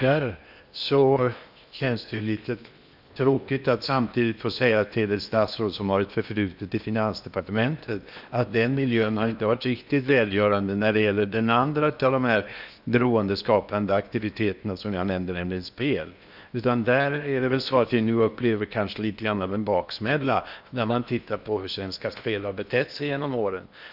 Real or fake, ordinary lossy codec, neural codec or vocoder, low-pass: fake; none; codec, 16 kHz in and 24 kHz out, 0.8 kbps, FocalCodec, streaming, 65536 codes; 5.4 kHz